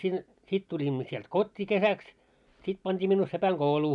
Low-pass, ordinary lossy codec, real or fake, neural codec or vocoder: 10.8 kHz; none; real; none